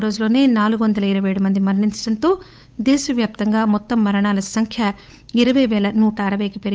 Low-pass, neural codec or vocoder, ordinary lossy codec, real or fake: none; codec, 16 kHz, 8 kbps, FunCodec, trained on Chinese and English, 25 frames a second; none; fake